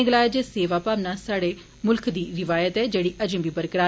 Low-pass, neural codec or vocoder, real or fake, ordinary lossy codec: none; none; real; none